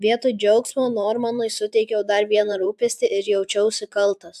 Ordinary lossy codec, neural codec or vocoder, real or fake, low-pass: AAC, 96 kbps; vocoder, 44.1 kHz, 128 mel bands every 512 samples, BigVGAN v2; fake; 14.4 kHz